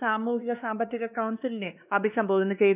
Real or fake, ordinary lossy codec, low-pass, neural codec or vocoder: fake; none; 3.6 kHz; codec, 16 kHz, 2 kbps, X-Codec, WavLM features, trained on Multilingual LibriSpeech